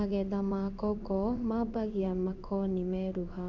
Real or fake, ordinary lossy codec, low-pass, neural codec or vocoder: fake; none; 7.2 kHz; codec, 16 kHz in and 24 kHz out, 1 kbps, XY-Tokenizer